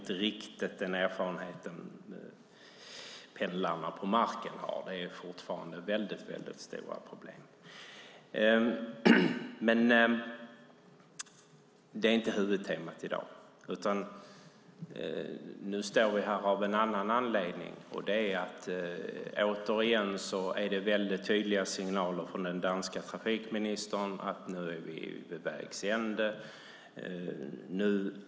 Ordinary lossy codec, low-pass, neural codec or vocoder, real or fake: none; none; none; real